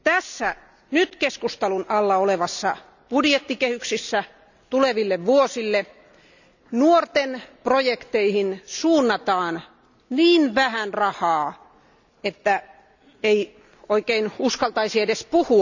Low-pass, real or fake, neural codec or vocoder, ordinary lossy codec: 7.2 kHz; real; none; none